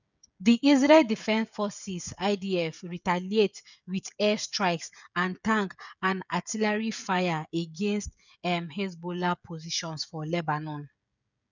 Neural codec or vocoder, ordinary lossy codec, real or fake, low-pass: codec, 16 kHz, 16 kbps, FreqCodec, smaller model; none; fake; 7.2 kHz